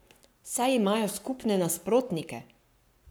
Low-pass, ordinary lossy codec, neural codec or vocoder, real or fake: none; none; codec, 44.1 kHz, 7.8 kbps, Pupu-Codec; fake